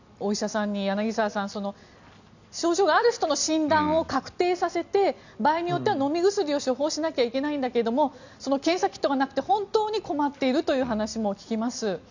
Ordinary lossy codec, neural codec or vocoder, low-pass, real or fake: none; none; 7.2 kHz; real